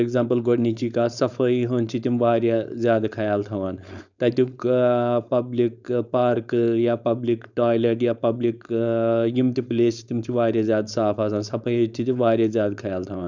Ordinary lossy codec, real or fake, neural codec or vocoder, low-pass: none; fake; codec, 16 kHz, 4.8 kbps, FACodec; 7.2 kHz